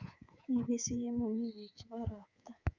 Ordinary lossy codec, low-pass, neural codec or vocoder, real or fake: Opus, 64 kbps; 7.2 kHz; codec, 24 kHz, 3.1 kbps, DualCodec; fake